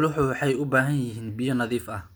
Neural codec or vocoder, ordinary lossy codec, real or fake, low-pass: none; none; real; none